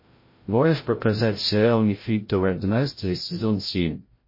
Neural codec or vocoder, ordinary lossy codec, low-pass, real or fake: codec, 16 kHz, 0.5 kbps, FreqCodec, larger model; MP3, 24 kbps; 5.4 kHz; fake